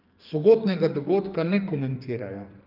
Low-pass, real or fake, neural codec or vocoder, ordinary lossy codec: 5.4 kHz; fake; codec, 44.1 kHz, 2.6 kbps, SNAC; Opus, 24 kbps